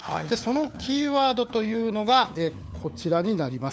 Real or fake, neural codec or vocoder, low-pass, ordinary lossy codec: fake; codec, 16 kHz, 4 kbps, FunCodec, trained on LibriTTS, 50 frames a second; none; none